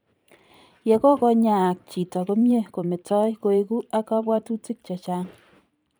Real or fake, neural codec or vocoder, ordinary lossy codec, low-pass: fake; vocoder, 44.1 kHz, 128 mel bands every 512 samples, BigVGAN v2; none; none